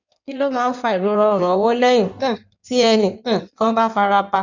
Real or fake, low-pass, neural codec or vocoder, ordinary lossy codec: fake; 7.2 kHz; codec, 16 kHz in and 24 kHz out, 1.1 kbps, FireRedTTS-2 codec; none